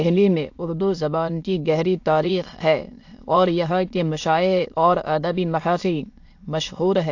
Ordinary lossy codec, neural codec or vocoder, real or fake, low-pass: AAC, 48 kbps; autoencoder, 22.05 kHz, a latent of 192 numbers a frame, VITS, trained on many speakers; fake; 7.2 kHz